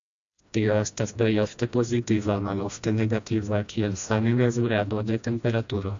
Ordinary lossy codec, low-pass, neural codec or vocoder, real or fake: AAC, 64 kbps; 7.2 kHz; codec, 16 kHz, 1 kbps, FreqCodec, smaller model; fake